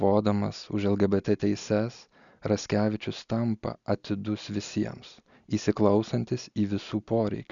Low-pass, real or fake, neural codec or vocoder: 7.2 kHz; real; none